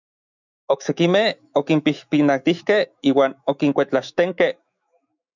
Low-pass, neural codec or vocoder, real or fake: 7.2 kHz; autoencoder, 48 kHz, 128 numbers a frame, DAC-VAE, trained on Japanese speech; fake